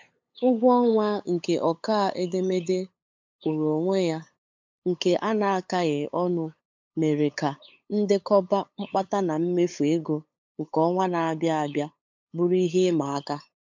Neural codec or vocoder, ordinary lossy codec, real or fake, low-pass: codec, 16 kHz, 8 kbps, FunCodec, trained on LibriTTS, 25 frames a second; MP3, 64 kbps; fake; 7.2 kHz